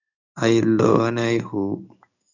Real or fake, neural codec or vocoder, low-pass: fake; codec, 16 kHz in and 24 kHz out, 1 kbps, XY-Tokenizer; 7.2 kHz